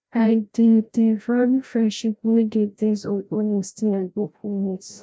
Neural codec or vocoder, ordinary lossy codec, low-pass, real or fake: codec, 16 kHz, 0.5 kbps, FreqCodec, larger model; none; none; fake